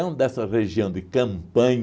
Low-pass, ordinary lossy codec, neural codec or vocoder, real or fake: none; none; none; real